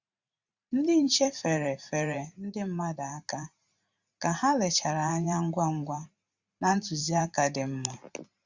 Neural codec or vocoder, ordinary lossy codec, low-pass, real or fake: vocoder, 44.1 kHz, 128 mel bands every 512 samples, BigVGAN v2; Opus, 64 kbps; 7.2 kHz; fake